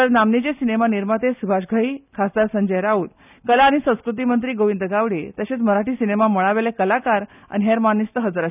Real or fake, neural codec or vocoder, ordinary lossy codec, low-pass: real; none; none; 3.6 kHz